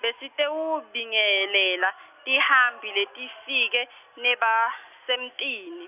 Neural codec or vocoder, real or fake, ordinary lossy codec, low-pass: none; real; none; 3.6 kHz